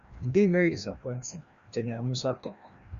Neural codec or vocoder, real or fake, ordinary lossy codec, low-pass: codec, 16 kHz, 1 kbps, FreqCodec, larger model; fake; Opus, 64 kbps; 7.2 kHz